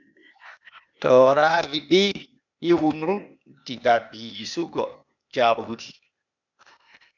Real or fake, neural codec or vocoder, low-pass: fake; codec, 16 kHz, 0.8 kbps, ZipCodec; 7.2 kHz